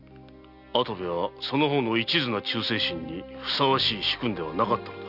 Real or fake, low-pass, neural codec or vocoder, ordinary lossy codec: real; 5.4 kHz; none; none